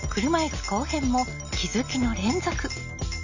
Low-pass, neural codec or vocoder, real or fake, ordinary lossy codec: 7.2 kHz; none; real; none